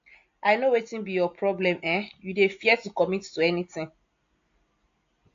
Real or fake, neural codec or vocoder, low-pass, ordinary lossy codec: real; none; 7.2 kHz; AAC, 48 kbps